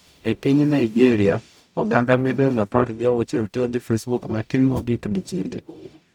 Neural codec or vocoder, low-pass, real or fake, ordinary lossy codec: codec, 44.1 kHz, 0.9 kbps, DAC; 19.8 kHz; fake; none